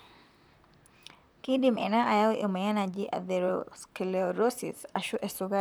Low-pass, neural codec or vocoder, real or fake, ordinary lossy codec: none; vocoder, 44.1 kHz, 128 mel bands, Pupu-Vocoder; fake; none